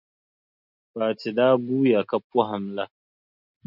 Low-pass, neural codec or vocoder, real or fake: 5.4 kHz; none; real